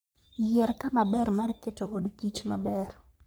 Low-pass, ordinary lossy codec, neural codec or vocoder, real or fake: none; none; codec, 44.1 kHz, 3.4 kbps, Pupu-Codec; fake